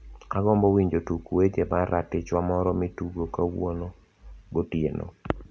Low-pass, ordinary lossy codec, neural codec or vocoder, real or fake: none; none; none; real